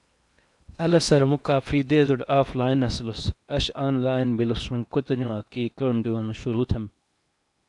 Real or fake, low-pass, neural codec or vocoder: fake; 10.8 kHz; codec, 16 kHz in and 24 kHz out, 0.8 kbps, FocalCodec, streaming, 65536 codes